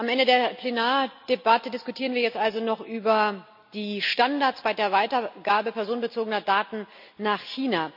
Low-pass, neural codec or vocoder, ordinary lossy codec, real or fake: 5.4 kHz; none; none; real